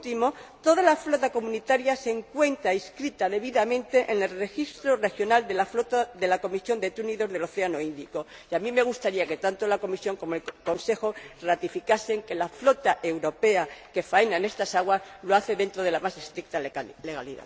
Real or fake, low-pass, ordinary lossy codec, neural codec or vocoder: real; none; none; none